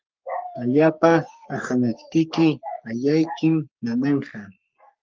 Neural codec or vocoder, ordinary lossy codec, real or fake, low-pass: codec, 44.1 kHz, 3.4 kbps, Pupu-Codec; Opus, 32 kbps; fake; 7.2 kHz